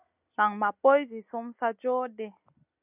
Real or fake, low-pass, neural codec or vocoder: real; 3.6 kHz; none